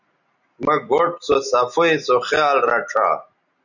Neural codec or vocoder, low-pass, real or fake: none; 7.2 kHz; real